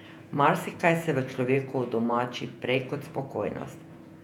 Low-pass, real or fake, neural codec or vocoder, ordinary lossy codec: 19.8 kHz; fake; vocoder, 48 kHz, 128 mel bands, Vocos; none